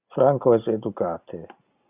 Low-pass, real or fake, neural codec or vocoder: 3.6 kHz; real; none